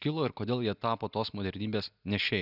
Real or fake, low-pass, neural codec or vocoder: real; 5.4 kHz; none